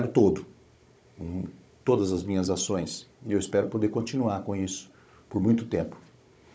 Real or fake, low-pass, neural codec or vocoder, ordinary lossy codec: fake; none; codec, 16 kHz, 16 kbps, FunCodec, trained on Chinese and English, 50 frames a second; none